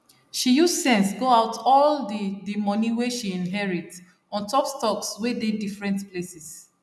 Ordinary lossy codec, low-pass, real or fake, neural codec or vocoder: none; none; real; none